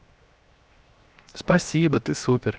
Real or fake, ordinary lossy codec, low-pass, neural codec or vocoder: fake; none; none; codec, 16 kHz, 0.7 kbps, FocalCodec